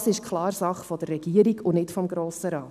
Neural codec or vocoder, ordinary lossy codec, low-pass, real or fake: none; none; 14.4 kHz; real